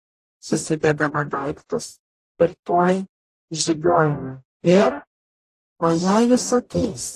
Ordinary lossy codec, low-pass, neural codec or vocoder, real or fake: AAC, 48 kbps; 14.4 kHz; codec, 44.1 kHz, 0.9 kbps, DAC; fake